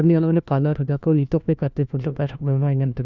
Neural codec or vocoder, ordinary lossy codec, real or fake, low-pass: codec, 16 kHz, 1 kbps, FunCodec, trained on LibriTTS, 50 frames a second; none; fake; 7.2 kHz